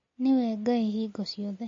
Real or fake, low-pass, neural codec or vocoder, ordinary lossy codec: real; 7.2 kHz; none; MP3, 32 kbps